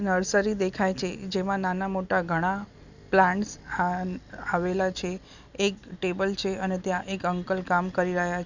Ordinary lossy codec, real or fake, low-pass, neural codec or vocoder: none; real; 7.2 kHz; none